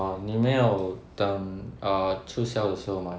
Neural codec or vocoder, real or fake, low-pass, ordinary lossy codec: none; real; none; none